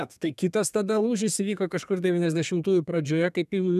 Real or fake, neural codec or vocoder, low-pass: fake; codec, 32 kHz, 1.9 kbps, SNAC; 14.4 kHz